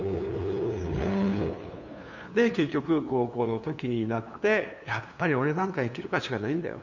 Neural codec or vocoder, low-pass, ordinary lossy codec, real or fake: codec, 16 kHz, 2 kbps, FunCodec, trained on LibriTTS, 25 frames a second; 7.2 kHz; none; fake